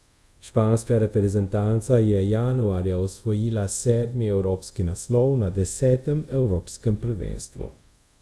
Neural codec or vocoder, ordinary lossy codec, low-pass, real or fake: codec, 24 kHz, 0.5 kbps, DualCodec; none; none; fake